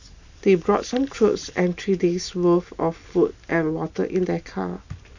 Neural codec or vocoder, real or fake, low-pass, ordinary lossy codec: none; real; 7.2 kHz; none